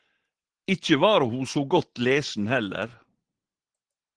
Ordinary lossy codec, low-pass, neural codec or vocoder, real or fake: Opus, 16 kbps; 9.9 kHz; none; real